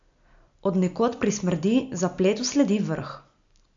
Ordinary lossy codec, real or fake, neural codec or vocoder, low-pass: AAC, 64 kbps; real; none; 7.2 kHz